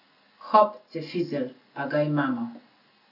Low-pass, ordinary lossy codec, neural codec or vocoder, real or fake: 5.4 kHz; none; none; real